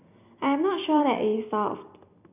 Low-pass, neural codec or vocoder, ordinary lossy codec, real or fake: 3.6 kHz; vocoder, 44.1 kHz, 128 mel bands every 256 samples, BigVGAN v2; none; fake